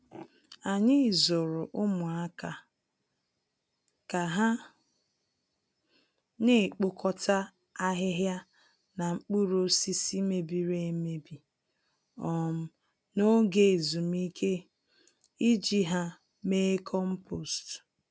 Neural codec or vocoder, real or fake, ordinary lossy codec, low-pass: none; real; none; none